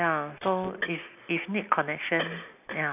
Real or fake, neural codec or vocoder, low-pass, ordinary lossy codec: real; none; 3.6 kHz; none